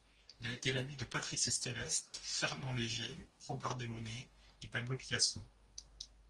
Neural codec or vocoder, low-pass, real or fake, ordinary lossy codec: codec, 44.1 kHz, 2.6 kbps, DAC; 10.8 kHz; fake; Opus, 24 kbps